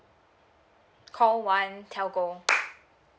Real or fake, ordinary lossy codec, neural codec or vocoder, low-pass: real; none; none; none